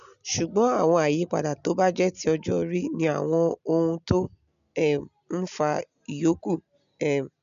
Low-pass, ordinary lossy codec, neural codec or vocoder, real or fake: 7.2 kHz; MP3, 96 kbps; none; real